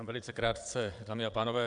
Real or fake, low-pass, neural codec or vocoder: fake; 9.9 kHz; vocoder, 22.05 kHz, 80 mel bands, Vocos